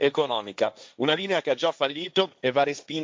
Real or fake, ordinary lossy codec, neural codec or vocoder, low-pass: fake; none; codec, 16 kHz, 1.1 kbps, Voila-Tokenizer; none